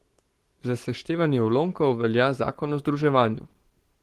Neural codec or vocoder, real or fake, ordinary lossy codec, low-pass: codec, 44.1 kHz, 7.8 kbps, Pupu-Codec; fake; Opus, 16 kbps; 14.4 kHz